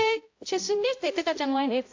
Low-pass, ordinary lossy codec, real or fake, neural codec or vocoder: 7.2 kHz; AAC, 48 kbps; fake; codec, 16 kHz, 0.5 kbps, X-Codec, HuBERT features, trained on balanced general audio